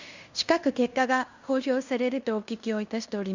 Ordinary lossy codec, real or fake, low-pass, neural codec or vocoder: Opus, 64 kbps; fake; 7.2 kHz; codec, 16 kHz in and 24 kHz out, 0.9 kbps, LongCat-Audio-Codec, fine tuned four codebook decoder